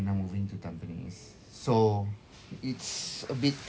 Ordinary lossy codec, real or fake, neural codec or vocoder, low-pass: none; real; none; none